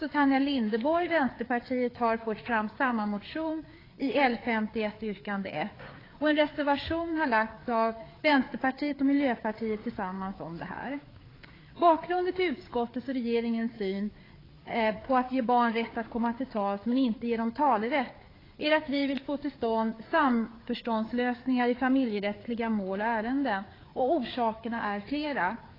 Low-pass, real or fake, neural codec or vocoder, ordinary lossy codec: 5.4 kHz; fake; codec, 16 kHz, 4 kbps, FreqCodec, larger model; AAC, 24 kbps